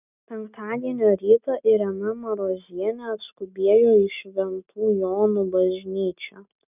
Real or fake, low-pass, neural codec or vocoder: real; 3.6 kHz; none